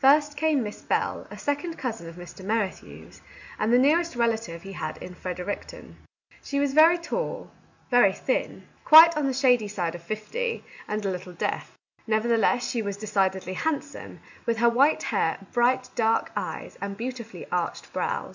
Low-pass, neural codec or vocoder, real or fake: 7.2 kHz; none; real